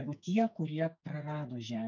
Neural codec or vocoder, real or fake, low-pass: codec, 44.1 kHz, 2.6 kbps, SNAC; fake; 7.2 kHz